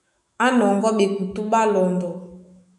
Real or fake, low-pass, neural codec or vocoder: fake; 10.8 kHz; autoencoder, 48 kHz, 128 numbers a frame, DAC-VAE, trained on Japanese speech